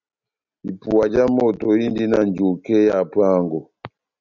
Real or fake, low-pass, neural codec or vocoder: real; 7.2 kHz; none